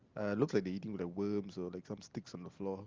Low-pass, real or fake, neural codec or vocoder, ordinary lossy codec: 7.2 kHz; real; none; Opus, 32 kbps